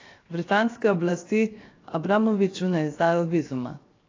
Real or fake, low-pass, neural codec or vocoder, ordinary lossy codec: fake; 7.2 kHz; codec, 16 kHz, 0.7 kbps, FocalCodec; AAC, 32 kbps